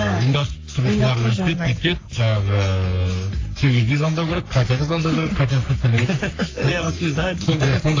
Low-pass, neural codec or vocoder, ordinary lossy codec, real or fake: 7.2 kHz; codec, 44.1 kHz, 3.4 kbps, Pupu-Codec; AAC, 32 kbps; fake